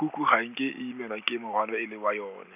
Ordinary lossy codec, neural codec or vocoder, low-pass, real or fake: none; none; 3.6 kHz; real